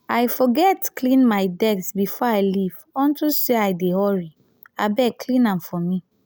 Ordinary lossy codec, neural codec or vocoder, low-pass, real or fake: none; none; none; real